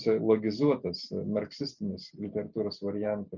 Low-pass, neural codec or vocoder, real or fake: 7.2 kHz; none; real